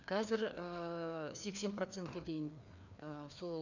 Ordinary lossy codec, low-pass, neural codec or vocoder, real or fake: none; 7.2 kHz; codec, 16 kHz, 2 kbps, FreqCodec, larger model; fake